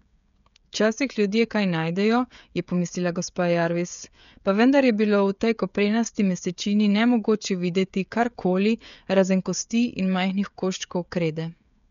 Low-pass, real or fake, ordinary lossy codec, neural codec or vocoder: 7.2 kHz; fake; none; codec, 16 kHz, 16 kbps, FreqCodec, smaller model